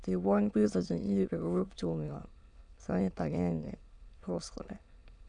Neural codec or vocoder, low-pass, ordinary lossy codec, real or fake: autoencoder, 22.05 kHz, a latent of 192 numbers a frame, VITS, trained on many speakers; 9.9 kHz; none; fake